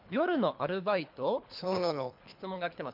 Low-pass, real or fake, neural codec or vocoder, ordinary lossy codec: 5.4 kHz; fake; codec, 24 kHz, 3 kbps, HILCodec; MP3, 48 kbps